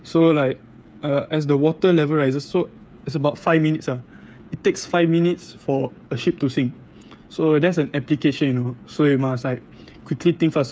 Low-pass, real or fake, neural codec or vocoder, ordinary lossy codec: none; fake; codec, 16 kHz, 8 kbps, FreqCodec, smaller model; none